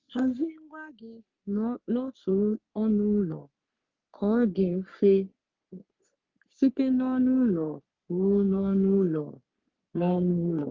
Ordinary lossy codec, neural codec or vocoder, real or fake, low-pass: Opus, 32 kbps; codec, 44.1 kHz, 3.4 kbps, Pupu-Codec; fake; 7.2 kHz